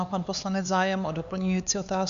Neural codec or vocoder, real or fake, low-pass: codec, 16 kHz, 4 kbps, X-Codec, HuBERT features, trained on LibriSpeech; fake; 7.2 kHz